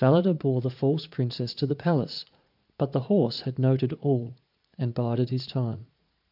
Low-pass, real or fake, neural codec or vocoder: 5.4 kHz; fake; vocoder, 44.1 kHz, 80 mel bands, Vocos